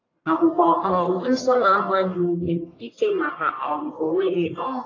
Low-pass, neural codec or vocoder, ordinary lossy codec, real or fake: 7.2 kHz; codec, 44.1 kHz, 1.7 kbps, Pupu-Codec; AAC, 32 kbps; fake